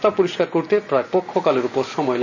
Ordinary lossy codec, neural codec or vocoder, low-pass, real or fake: none; none; 7.2 kHz; real